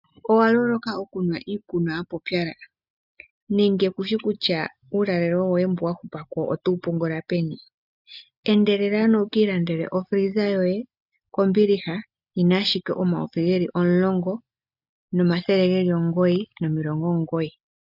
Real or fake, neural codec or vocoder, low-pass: real; none; 5.4 kHz